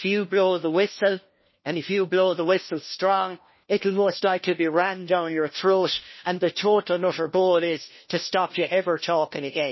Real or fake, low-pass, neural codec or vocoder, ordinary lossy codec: fake; 7.2 kHz; codec, 16 kHz, 1 kbps, FunCodec, trained on LibriTTS, 50 frames a second; MP3, 24 kbps